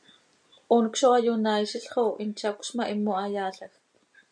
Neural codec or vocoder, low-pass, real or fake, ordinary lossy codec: none; 9.9 kHz; real; MP3, 96 kbps